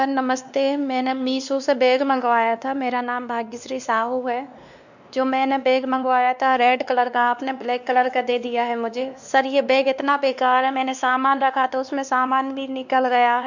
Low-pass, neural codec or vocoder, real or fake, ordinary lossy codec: 7.2 kHz; codec, 16 kHz, 2 kbps, X-Codec, WavLM features, trained on Multilingual LibriSpeech; fake; none